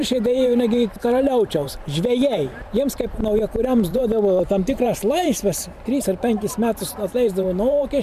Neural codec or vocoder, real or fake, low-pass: vocoder, 44.1 kHz, 128 mel bands every 512 samples, BigVGAN v2; fake; 14.4 kHz